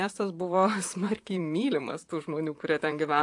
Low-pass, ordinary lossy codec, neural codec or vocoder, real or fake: 10.8 kHz; AAC, 64 kbps; vocoder, 44.1 kHz, 128 mel bands, Pupu-Vocoder; fake